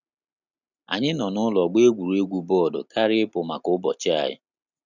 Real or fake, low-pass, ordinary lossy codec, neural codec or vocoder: real; 7.2 kHz; none; none